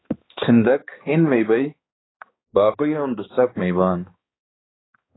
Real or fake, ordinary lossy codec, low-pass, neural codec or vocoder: fake; AAC, 16 kbps; 7.2 kHz; codec, 16 kHz, 2 kbps, X-Codec, HuBERT features, trained on balanced general audio